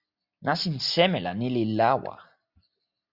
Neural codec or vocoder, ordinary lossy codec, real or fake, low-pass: none; Opus, 64 kbps; real; 5.4 kHz